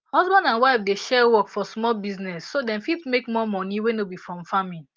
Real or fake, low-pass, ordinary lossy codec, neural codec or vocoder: real; 7.2 kHz; Opus, 32 kbps; none